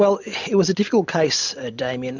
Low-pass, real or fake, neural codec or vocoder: 7.2 kHz; real; none